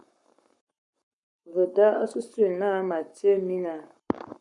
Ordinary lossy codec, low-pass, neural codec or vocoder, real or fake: MP3, 96 kbps; 10.8 kHz; codec, 44.1 kHz, 7.8 kbps, Pupu-Codec; fake